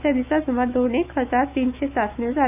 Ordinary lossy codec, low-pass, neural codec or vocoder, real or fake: none; 3.6 kHz; autoencoder, 48 kHz, 128 numbers a frame, DAC-VAE, trained on Japanese speech; fake